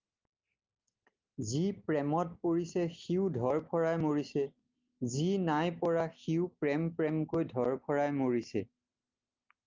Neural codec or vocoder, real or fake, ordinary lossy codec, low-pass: none; real; Opus, 24 kbps; 7.2 kHz